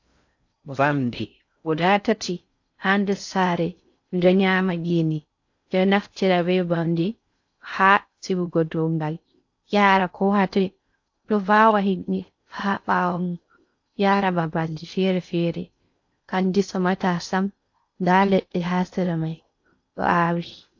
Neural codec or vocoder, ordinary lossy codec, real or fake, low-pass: codec, 16 kHz in and 24 kHz out, 0.6 kbps, FocalCodec, streaming, 4096 codes; AAC, 48 kbps; fake; 7.2 kHz